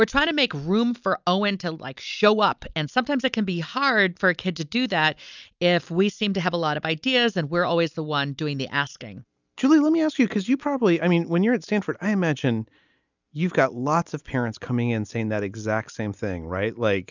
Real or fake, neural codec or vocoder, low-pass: real; none; 7.2 kHz